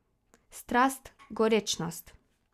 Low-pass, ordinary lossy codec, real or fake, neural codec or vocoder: 14.4 kHz; Opus, 64 kbps; real; none